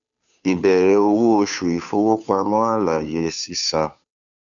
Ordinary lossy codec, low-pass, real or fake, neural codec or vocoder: none; 7.2 kHz; fake; codec, 16 kHz, 2 kbps, FunCodec, trained on Chinese and English, 25 frames a second